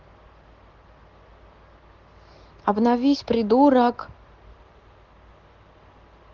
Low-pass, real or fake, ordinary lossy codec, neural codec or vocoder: 7.2 kHz; real; Opus, 16 kbps; none